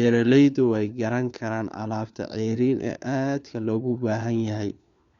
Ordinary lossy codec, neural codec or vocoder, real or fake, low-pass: Opus, 64 kbps; codec, 16 kHz, 4 kbps, FunCodec, trained on LibriTTS, 50 frames a second; fake; 7.2 kHz